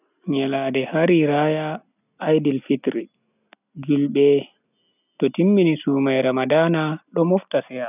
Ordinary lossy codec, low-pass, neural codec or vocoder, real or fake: none; 3.6 kHz; none; real